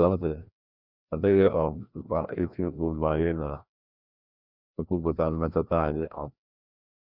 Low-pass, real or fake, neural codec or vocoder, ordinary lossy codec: 5.4 kHz; fake; codec, 16 kHz, 1 kbps, FreqCodec, larger model; none